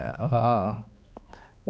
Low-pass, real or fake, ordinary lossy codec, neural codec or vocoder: none; fake; none; codec, 16 kHz, 2 kbps, X-Codec, HuBERT features, trained on balanced general audio